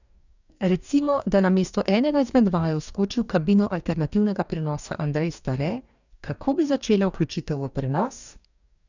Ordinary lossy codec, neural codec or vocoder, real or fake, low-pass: none; codec, 44.1 kHz, 2.6 kbps, DAC; fake; 7.2 kHz